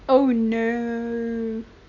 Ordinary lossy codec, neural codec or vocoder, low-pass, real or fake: none; none; 7.2 kHz; real